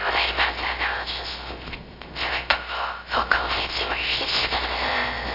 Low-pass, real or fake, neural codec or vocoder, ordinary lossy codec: 5.4 kHz; fake; codec, 16 kHz, 0.3 kbps, FocalCodec; AAC, 48 kbps